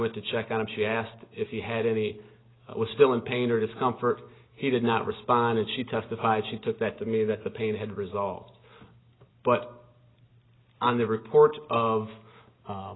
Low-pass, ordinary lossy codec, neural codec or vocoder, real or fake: 7.2 kHz; AAC, 16 kbps; none; real